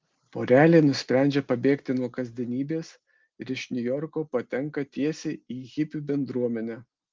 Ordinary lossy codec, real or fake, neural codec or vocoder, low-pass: Opus, 32 kbps; real; none; 7.2 kHz